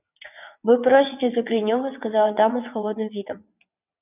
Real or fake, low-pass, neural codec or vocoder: fake; 3.6 kHz; vocoder, 22.05 kHz, 80 mel bands, WaveNeXt